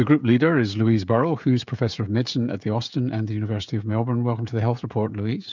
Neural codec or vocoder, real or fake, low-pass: codec, 16 kHz, 16 kbps, FreqCodec, smaller model; fake; 7.2 kHz